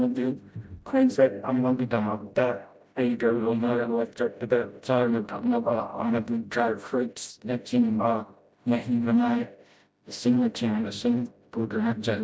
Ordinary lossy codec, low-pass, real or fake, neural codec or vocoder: none; none; fake; codec, 16 kHz, 0.5 kbps, FreqCodec, smaller model